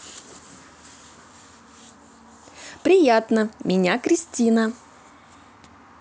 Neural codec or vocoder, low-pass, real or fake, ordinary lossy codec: none; none; real; none